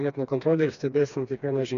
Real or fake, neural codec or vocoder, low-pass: fake; codec, 16 kHz, 2 kbps, FreqCodec, smaller model; 7.2 kHz